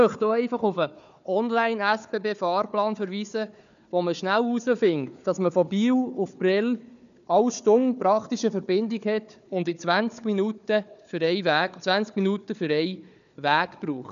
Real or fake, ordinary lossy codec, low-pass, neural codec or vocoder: fake; none; 7.2 kHz; codec, 16 kHz, 4 kbps, FunCodec, trained on Chinese and English, 50 frames a second